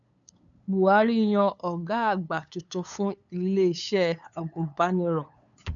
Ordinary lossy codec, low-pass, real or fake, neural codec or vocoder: MP3, 96 kbps; 7.2 kHz; fake; codec, 16 kHz, 8 kbps, FunCodec, trained on LibriTTS, 25 frames a second